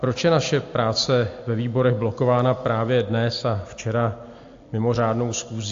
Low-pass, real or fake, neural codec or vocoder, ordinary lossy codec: 7.2 kHz; real; none; AAC, 48 kbps